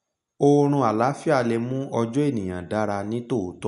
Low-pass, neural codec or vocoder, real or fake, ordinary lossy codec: 10.8 kHz; none; real; none